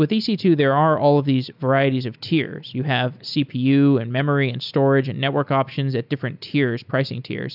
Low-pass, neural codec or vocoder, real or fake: 5.4 kHz; none; real